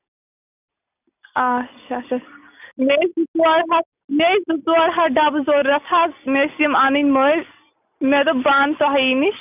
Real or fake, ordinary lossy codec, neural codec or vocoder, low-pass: real; none; none; 3.6 kHz